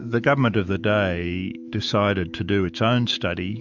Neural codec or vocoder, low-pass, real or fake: autoencoder, 48 kHz, 128 numbers a frame, DAC-VAE, trained on Japanese speech; 7.2 kHz; fake